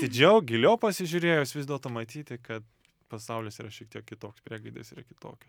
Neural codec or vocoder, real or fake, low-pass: none; real; 19.8 kHz